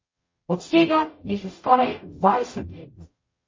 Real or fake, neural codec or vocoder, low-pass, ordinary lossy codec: fake; codec, 44.1 kHz, 0.9 kbps, DAC; 7.2 kHz; MP3, 32 kbps